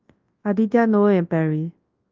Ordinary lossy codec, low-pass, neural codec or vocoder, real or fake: Opus, 32 kbps; 7.2 kHz; codec, 24 kHz, 0.9 kbps, WavTokenizer, large speech release; fake